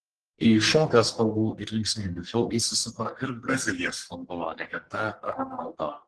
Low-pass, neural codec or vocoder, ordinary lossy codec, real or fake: 10.8 kHz; codec, 44.1 kHz, 1.7 kbps, Pupu-Codec; Opus, 16 kbps; fake